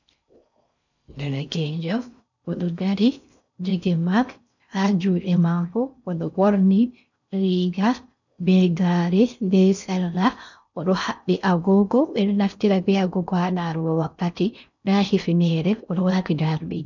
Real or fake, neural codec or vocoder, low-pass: fake; codec, 16 kHz in and 24 kHz out, 0.6 kbps, FocalCodec, streaming, 4096 codes; 7.2 kHz